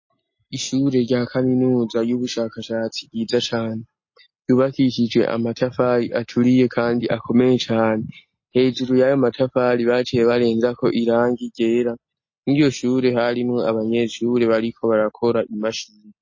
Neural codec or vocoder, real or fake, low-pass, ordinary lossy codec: none; real; 7.2 kHz; MP3, 32 kbps